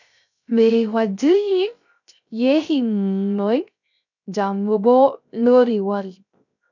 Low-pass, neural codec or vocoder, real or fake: 7.2 kHz; codec, 16 kHz, 0.3 kbps, FocalCodec; fake